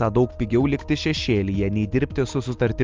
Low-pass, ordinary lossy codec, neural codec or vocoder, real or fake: 7.2 kHz; Opus, 24 kbps; none; real